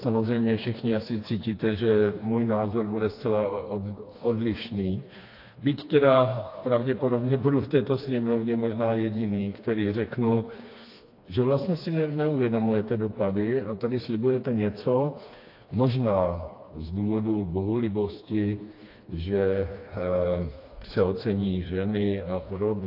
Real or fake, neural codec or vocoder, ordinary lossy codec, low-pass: fake; codec, 16 kHz, 2 kbps, FreqCodec, smaller model; AAC, 32 kbps; 5.4 kHz